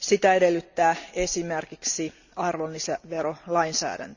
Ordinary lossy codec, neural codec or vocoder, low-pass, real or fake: none; none; 7.2 kHz; real